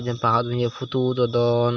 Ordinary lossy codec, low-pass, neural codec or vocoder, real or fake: none; 7.2 kHz; none; real